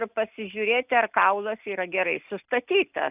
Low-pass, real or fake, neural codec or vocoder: 3.6 kHz; real; none